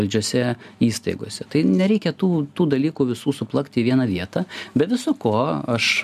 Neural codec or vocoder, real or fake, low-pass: none; real; 14.4 kHz